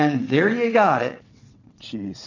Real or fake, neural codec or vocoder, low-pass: fake; vocoder, 22.05 kHz, 80 mel bands, WaveNeXt; 7.2 kHz